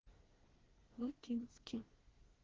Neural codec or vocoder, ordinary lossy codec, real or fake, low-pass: codec, 24 kHz, 1 kbps, SNAC; Opus, 16 kbps; fake; 7.2 kHz